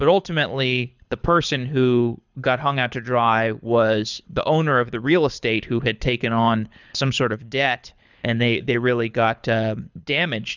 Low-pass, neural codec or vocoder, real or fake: 7.2 kHz; codec, 24 kHz, 6 kbps, HILCodec; fake